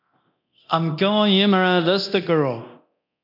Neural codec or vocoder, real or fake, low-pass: codec, 24 kHz, 0.9 kbps, DualCodec; fake; 5.4 kHz